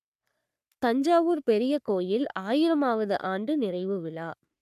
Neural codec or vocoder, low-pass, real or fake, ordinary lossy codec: codec, 44.1 kHz, 3.4 kbps, Pupu-Codec; 14.4 kHz; fake; none